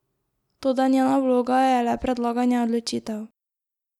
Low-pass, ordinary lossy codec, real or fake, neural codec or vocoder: 19.8 kHz; none; real; none